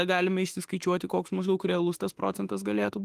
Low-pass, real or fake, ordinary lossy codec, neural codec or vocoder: 14.4 kHz; fake; Opus, 24 kbps; autoencoder, 48 kHz, 32 numbers a frame, DAC-VAE, trained on Japanese speech